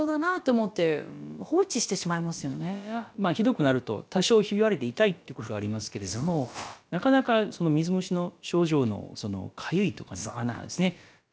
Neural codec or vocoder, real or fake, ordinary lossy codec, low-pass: codec, 16 kHz, about 1 kbps, DyCAST, with the encoder's durations; fake; none; none